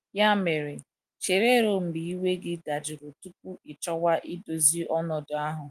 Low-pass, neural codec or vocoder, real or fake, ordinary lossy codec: 14.4 kHz; none; real; Opus, 32 kbps